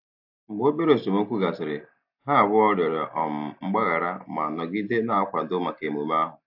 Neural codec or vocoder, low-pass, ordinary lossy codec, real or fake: none; 5.4 kHz; AAC, 48 kbps; real